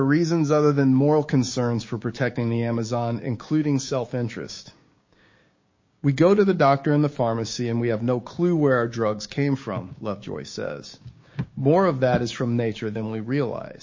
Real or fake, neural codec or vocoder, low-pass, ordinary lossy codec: fake; codec, 16 kHz, 6 kbps, DAC; 7.2 kHz; MP3, 32 kbps